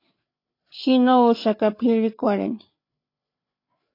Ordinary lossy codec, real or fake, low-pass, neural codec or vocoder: AAC, 32 kbps; fake; 5.4 kHz; codec, 16 kHz, 8 kbps, FreqCodec, larger model